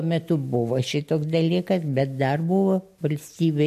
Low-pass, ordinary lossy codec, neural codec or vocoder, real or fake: 14.4 kHz; MP3, 64 kbps; none; real